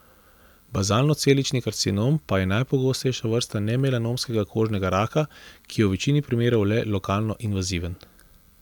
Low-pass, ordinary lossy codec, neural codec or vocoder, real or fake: 19.8 kHz; none; none; real